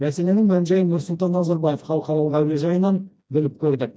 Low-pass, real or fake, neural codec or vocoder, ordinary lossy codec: none; fake; codec, 16 kHz, 1 kbps, FreqCodec, smaller model; none